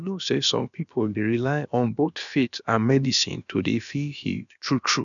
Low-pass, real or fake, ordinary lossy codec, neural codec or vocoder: 7.2 kHz; fake; none; codec, 16 kHz, about 1 kbps, DyCAST, with the encoder's durations